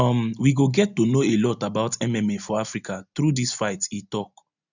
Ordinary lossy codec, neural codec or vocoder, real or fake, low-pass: none; none; real; 7.2 kHz